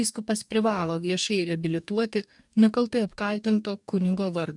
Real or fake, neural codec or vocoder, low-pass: fake; codec, 44.1 kHz, 2.6 kbps, DAC; 10.8 kHz